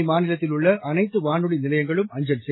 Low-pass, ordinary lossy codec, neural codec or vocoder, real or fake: 7.2 kHz; AAC, 16 kbps; none; real